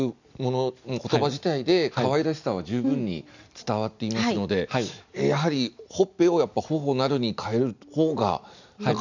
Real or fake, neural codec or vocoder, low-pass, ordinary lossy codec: real; none; 7.2 kHz; none